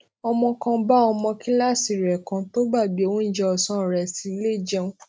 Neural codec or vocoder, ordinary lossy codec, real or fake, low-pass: none; none; real; none